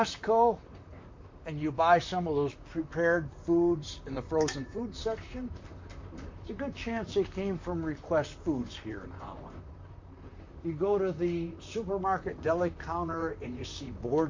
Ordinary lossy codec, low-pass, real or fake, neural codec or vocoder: MP3, 48 kbps; 7.2 kHz; fake; vocoder, 44.1 kHz, 128 mel bands, Pupu-Vocoder